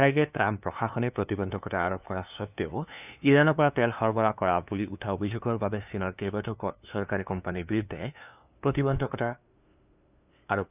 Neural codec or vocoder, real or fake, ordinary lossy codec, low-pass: codec, 16 kHz, 2 kbps, FunCodec, trained on LibriTTS, 25 frames a second; fake; none; 3.6 kHz